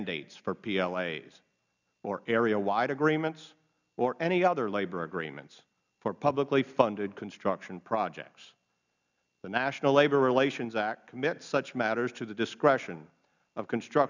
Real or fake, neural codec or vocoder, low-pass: real; none; 7.2 kHz